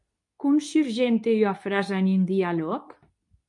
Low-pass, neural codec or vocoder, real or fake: 10.8 kHz; codec, 24 kHz, 0.9 kbps, WavTokenizer, medium speech release version 2; fake